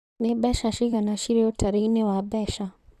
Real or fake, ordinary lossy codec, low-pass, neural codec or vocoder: fake; none; 14.4 kHz; vocoder, 44.1 kHz, 128 mel bands, Pupu-Vocoder